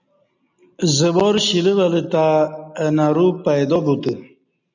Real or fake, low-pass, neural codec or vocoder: real; 7.2 kHz; none